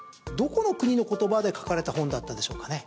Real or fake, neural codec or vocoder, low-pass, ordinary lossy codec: real; none; none; none